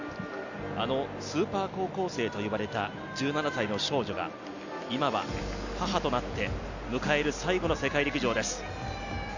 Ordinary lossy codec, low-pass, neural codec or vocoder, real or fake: none; 7.2 kHz; none; real